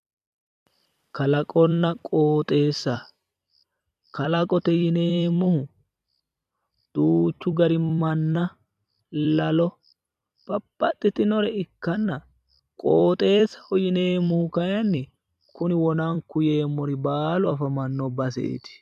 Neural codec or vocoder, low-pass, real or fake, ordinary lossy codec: vocoder, 44.1 kHz, 128 mel bands every 256 samples, BigVGAN v2; 14.4 kHz; fake; MP3, 96 kbps